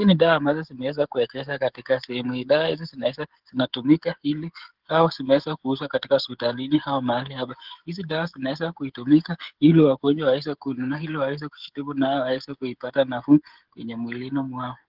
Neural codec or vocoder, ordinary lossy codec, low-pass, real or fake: codec, 16 kHz, 16 kbps, FreqCodec, smaller model; Opus, 16 kbps; 5.4 kHz; fake